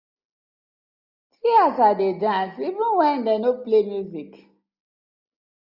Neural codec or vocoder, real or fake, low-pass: none; real; 5.4 kHz